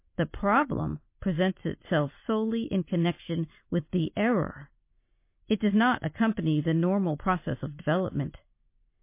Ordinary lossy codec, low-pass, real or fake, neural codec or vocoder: MP3, 24 kbps; 3.6 kHz; real; none